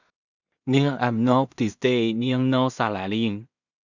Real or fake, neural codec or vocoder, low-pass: fake; codec, 16 kHz in and 24 kHz out, 0.4 kbps, LongCat-Audio-Codec, two codebook decoder; 7.2 kHz